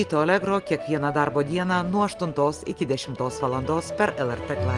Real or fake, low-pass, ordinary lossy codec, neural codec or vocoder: real; 10.8 kHz; Opus, 24 kbps; none